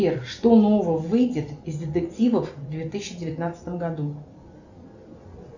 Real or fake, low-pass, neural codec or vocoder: real; 7.2 kHz; none